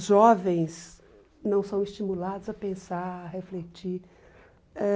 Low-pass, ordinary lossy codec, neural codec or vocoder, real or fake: none; none; none; real